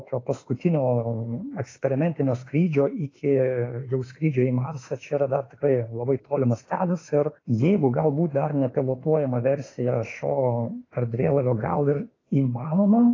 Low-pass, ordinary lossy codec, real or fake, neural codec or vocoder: 7.2 kHz; AAC, 32 kbps; fake; autoencoder, 48 kHz, 32 numbers a frame, DAC-VAE, trained on Japanese speech